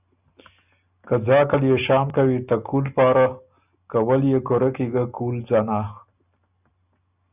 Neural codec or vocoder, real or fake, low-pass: none; real; 3.6 kHz